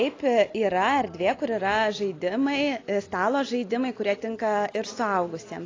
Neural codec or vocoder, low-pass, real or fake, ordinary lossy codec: none; 7.2 kHz; real; AAC, 32 kbps